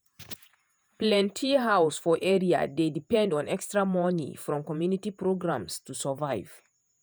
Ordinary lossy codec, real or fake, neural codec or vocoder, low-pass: none; fake; vocoder, 48 kHz, 128 mel bands, Vocos; none